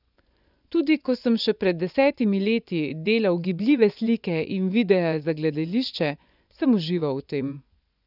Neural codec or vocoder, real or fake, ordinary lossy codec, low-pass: vocoder, 24 kHz, 100 mel bands, Vocos; fake; none; 5.4 kHz